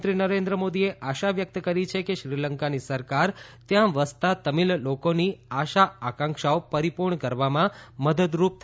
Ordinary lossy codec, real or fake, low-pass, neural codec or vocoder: none; real; none; none